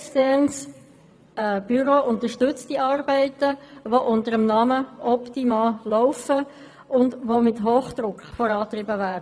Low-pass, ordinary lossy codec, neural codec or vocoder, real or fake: none; none; vocoder, 22.05 kHz, 80 mel bands, WaveNeXt; fake